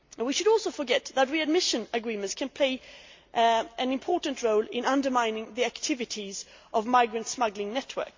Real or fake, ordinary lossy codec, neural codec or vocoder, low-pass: real; MP3, 48 kbps; none; 7.2 kHz